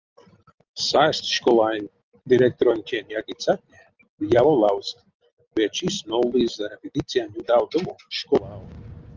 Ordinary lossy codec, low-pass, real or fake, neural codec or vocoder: Opus, 32 kbps; 7.2 kHz; real; none